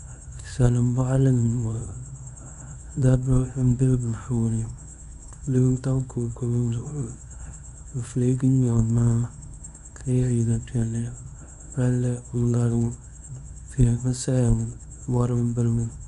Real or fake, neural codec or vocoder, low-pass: fake; codec, 24 kHz, 0.9 kbps, WavTokenizer, small release; 10.8 kHz